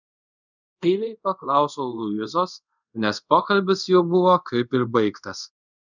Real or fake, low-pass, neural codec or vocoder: fake; 7.2 kHz; codec, 24 kHz, 0.5 kbps, DualCodec